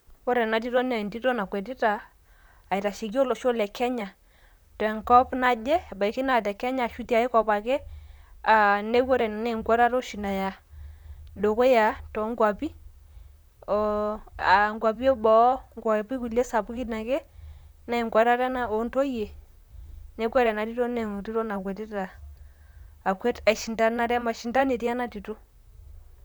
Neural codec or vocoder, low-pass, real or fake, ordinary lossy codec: vocoder, 44.1 kHz, 128 mel bands, Pupu-Vocoder; none; fake; none